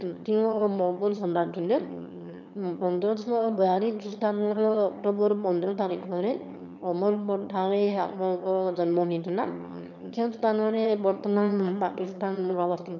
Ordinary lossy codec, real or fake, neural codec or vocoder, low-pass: none; fake; autoencoder, 22.05 kHz, a latent of 192 numbers a frame, VITS, trained on one speaker; 7.2 kHz